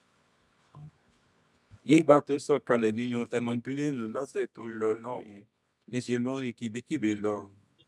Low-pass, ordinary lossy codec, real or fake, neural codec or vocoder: none; none; fake; codec, 24 kHz, 0.9 kbps, WavTokenizer, medium music audio release